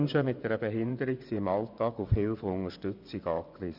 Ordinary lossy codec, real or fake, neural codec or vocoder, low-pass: none; fake; vocoder, 24 kHz, 100 mel bands, Vocos; 5.4 kHz